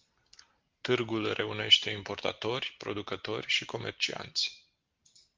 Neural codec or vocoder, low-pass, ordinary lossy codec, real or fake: none; 7.2 kHz; Opus, 32 kbps; real